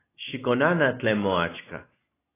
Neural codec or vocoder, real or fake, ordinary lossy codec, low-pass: none; real; AAC, 16 kbps; 3.6 kHz